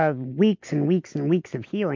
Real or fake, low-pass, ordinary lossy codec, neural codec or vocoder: real; 7.2 kHz; MP3, 48 kbps; none